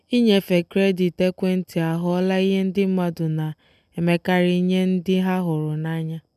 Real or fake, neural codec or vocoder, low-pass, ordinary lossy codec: real; none; 14.4 kHz; none